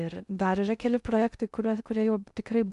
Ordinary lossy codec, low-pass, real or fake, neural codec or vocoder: MP3, 96 kbps; 10.8 kHz; fake; codec, 16 kHz in and 24 kHz out, 0.6 kbps, FocalCodec, streaming, 2048 codes